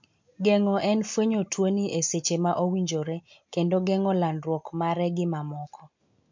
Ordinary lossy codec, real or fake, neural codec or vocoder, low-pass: MP3, 48 kbps; real; none; 7.2 kHz